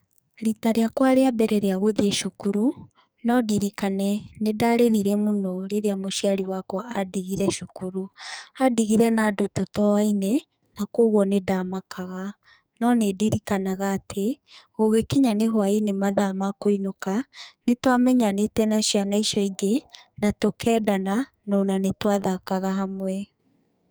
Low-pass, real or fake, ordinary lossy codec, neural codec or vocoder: none; fake; none; codec, 44.1 kHz, 2.6 kbps, SNAC